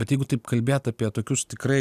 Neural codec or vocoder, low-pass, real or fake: none; 14.4 kHz; real